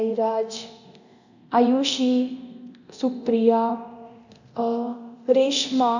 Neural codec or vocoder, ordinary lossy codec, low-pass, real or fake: codec, 24 kHz, 0.9 kbps, DualCodec; none; 7.2 kHz; fake